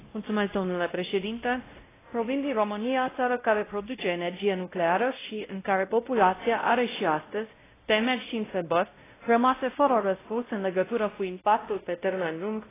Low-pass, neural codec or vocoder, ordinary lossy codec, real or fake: 3.6 kHz; codec, 16 kHz, 0.5 kbps, X-Codec, WavLM features, trained on Multilingual LibriSpeech; AAC, 16 kbps; fake